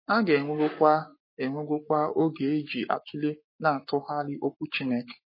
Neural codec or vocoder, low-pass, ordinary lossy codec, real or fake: codec, 44.1 kHz, 7.8 kbps, Pupu-Codec; 5.4 kHz; MP3, 24 kbps; fake